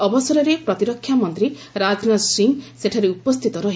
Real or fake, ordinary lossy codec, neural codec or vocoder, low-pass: real; none; none; none